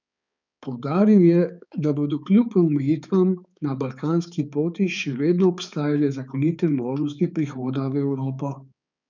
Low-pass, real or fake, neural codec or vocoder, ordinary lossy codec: 7.2 kHz; fake; codec, 16 kHz, 4 kbps, X-Codec, HuBERT features, trained on balanced general audio; none